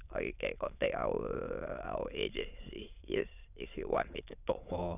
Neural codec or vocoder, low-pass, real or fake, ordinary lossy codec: autoencoder, 22.05 kHz, a latent of 192 numbers a frame, VITS, trained on many speakers; 3.6 kHz; fake; none